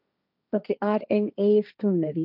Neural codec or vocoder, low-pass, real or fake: codec, 16 kHz, 1.1 kbps, Voila-Tokenizer; 5.4 kHz; fake